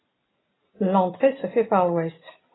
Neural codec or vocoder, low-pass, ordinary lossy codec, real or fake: none; 7.2 kHz; AAC, 16 kbps; real